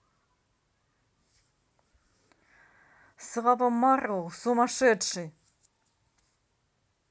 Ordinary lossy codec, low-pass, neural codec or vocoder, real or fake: none; none; none; real